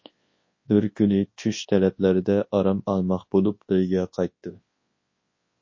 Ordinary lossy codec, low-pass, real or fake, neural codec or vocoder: MP3, 32 kbps; 7.2 kHz; fake; codec, 24 kHz, 0.9 kbps, WavTokenizer, large speech release